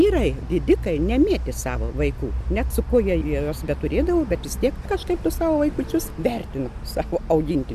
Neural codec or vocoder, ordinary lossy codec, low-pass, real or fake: none; MP3, 96 kbps; 14.4 kHz; real